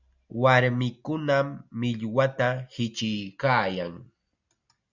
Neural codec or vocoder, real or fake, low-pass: none; real; 7.2 kHz